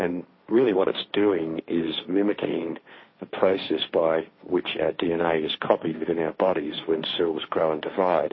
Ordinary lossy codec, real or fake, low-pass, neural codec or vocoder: MP3, 24 kbps; fake; 7.2 kHz; codec, 16 kHz, 1.1 kbps, Voila-Tokenizer